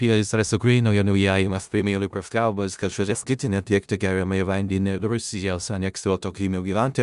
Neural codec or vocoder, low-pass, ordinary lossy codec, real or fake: codec, 16 kHz in and 24 kHz out, 0.4 kbps, LongCat-Audio-Codec, four codebook decoder; 10.8 kHz; Opus, 64 kbps; fake